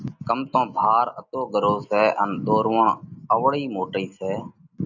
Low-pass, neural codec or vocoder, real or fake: 7.2 kHz; none; real